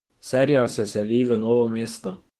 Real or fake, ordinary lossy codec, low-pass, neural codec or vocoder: fake; Opus, 16 kbps; 14.4 kHz; autoencoder, 48 kHz, 32 numbers a frame, DAC-VAE, trained on Japanese speech